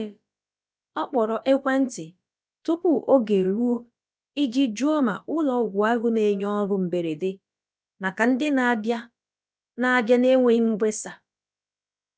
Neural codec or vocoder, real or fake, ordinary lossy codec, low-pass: codec, 16 kHz, about 1 kbps, DyCAST, with the encoder's durations; fake; none; none